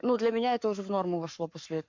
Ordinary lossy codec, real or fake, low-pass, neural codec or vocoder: MP3, 48 kbps; fake; 7.2 kHz; codec, 44.1 kHz, 7.8 kbps, Pupu-Codec